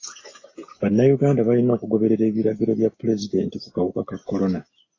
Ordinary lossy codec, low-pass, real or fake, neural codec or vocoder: AAC, 32 kbps; 7.2 kHz; real; none